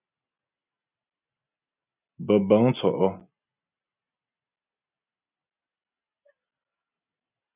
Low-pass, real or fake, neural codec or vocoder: 3.6 kHz; real; none